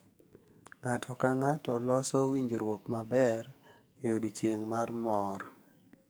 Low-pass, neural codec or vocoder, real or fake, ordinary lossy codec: none; codec, 44.1 kHz, 2.6 kbps, SNAC; fake; none